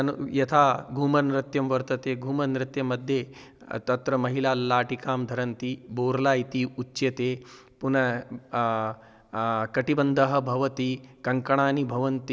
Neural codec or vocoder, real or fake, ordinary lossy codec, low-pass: none; real; none; none